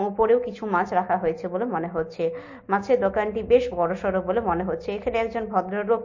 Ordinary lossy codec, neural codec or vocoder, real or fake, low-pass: MP3, 32 kbps; none; real; 7.2 kHz